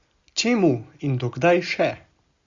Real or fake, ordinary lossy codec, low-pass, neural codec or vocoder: real; Opus, 64 kbps; 7.2 kHz; none